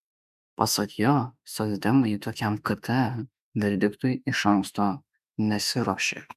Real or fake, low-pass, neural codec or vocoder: fake; 14.4 kHz; autoencoder, 48 kHz, 32 numbers a frame, DAC-VAE, trained on Japanese speech